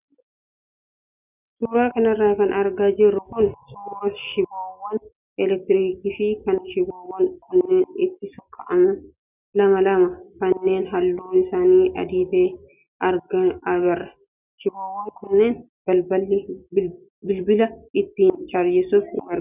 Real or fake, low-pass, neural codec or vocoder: real; 3.6 kHz; none